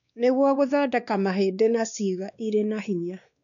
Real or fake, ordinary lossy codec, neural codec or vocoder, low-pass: fake; MP3, 96 kbps; codec, 16 kHz, 2 kbps, X-Codec, WavLM features, trained on Multilingual LibriSpeech; 7.2 kHz